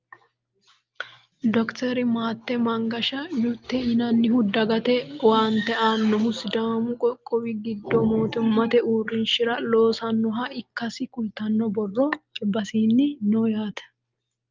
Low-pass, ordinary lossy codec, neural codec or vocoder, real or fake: 7.2 kHz; Opus, 32 kbps; none; real